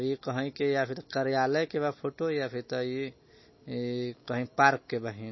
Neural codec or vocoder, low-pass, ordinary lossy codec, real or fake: none; 7.2 kHz; MP3, 24 kbps; real